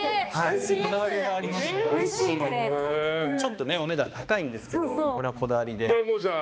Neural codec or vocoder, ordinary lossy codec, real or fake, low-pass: codec, 16 kHz, 2 kbps, X-Codec, HuBERT features, trained on balanced general audio; none; fake; none